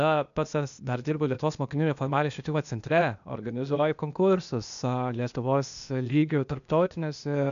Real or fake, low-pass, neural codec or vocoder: fake; 7.2 kHz; codec, 16 kHz, 0.8 kbps, ZipCodec